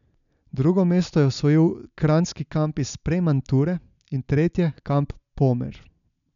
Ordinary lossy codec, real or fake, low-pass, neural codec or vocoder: none; real; 7.2 kHz; none